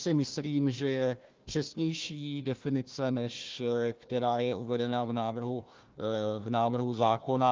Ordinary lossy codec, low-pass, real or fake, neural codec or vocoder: Opus, 32 kbps; 7.2 kHz; fake; codec, 16 kHz, 1 kbps, FunCodec, trained on Chinese and English, 50 frames a second